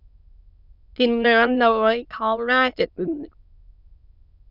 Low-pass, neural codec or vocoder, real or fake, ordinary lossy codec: 5.4 kHz; autoencoder, 22.05 kHz, a latent of 192 numbers a frame, VITS, trained on many speakers; fake; none